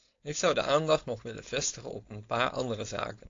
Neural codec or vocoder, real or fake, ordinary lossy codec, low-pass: codec, 16 kHz, 4.8 kbps, FACodec; fake; AAC, 48 kbps; 7.2 kHz